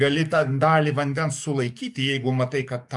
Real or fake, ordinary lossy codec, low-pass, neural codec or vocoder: fake; MP3, 96 kbps; 10.8 kHz; codec, 44.1 kHz, 7.8 kbps, DAC